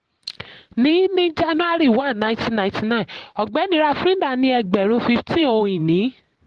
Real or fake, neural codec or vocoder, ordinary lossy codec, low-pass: fake; vocoder, 44.1 kHz, 128 mel bands every 512 samples, BigVGAN v2; Opus, 16 kbps; 10.8 kHz